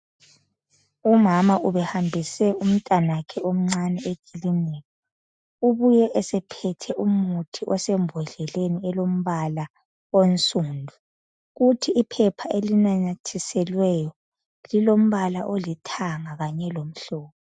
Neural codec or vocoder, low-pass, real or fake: none; 9.9 kHz; real